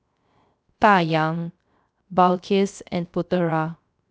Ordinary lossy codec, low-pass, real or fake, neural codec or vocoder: none; none; fake; codec, 16 kHz, 0.3 kbps, FocalCodec